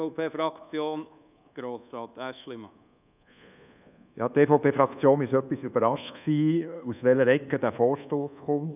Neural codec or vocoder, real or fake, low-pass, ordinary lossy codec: codec, 24 kHz, 1.2 kbps, DualCodec; fake; 3.6 kHz; none